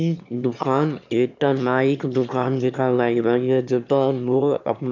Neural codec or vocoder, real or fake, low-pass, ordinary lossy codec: autoencoder, 22.05 kHz, a latent of 192 numbers a frame, VITS, trained on one speaker; fake; 7.2 kHz; MP3, 64 kbps